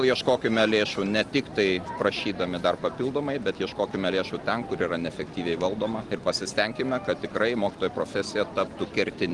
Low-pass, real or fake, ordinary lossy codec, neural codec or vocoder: 10.8 kHz; real; Opus, 24 kbps; none